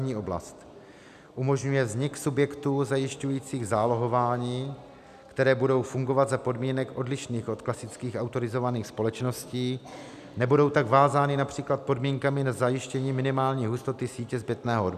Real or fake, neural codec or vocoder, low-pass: real; none; 14.4 kHz